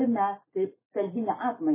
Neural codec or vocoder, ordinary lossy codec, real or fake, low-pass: autoencoder, 48 kHz, 128 numbers a frame, DAC-VAE, trained on Japanese speech; MP3, 16 kbps; fake; 3.6 kHz